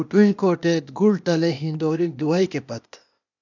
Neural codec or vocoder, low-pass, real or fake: codec, 16 kHz, 0.8 kbps, ZipCodec; 7.2 kHz; fake